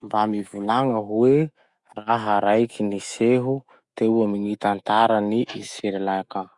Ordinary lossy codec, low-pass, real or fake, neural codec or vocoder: Opus, 64 kbps; 10.8 kHz; fake; autoencoder, 48 kHz, 128 numbers a frame, DAC-VAE, trained on Japanese speech